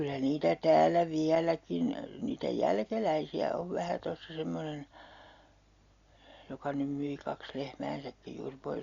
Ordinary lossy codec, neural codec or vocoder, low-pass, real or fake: Opus, 64 kbps; none; 7.2 kHz; real